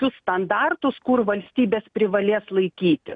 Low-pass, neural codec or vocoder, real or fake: 9.9 kHz; none; real